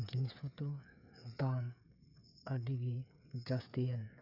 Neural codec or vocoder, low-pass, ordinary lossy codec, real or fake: codec, 16 kHz, 8 kbps, FreqCodec, smaller model; 5.4 kHz; none; fake